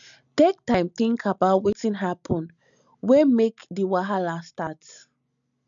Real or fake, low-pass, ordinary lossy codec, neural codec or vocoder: real; 7.2 kHz; none; none